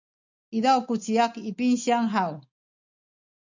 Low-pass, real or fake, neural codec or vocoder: 7.2 kHz; real; none